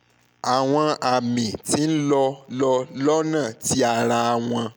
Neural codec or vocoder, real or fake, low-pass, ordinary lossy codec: none; real; none; none